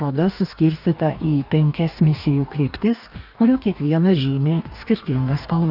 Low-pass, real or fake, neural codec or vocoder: 5.4 kHz; fake; codec, 44.1 kHz, 2.6 kbps, DAC